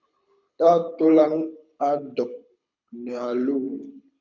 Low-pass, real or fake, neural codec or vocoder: 7.2 kHz; fake; codec, 24 kHz, 6 kbps, HILCodec